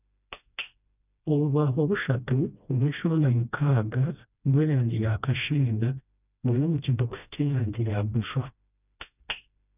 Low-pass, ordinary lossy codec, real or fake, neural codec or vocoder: 3.6 kHz; none; fake; codec, 16 kHz, 1 kbps, FreqCodec, smaller model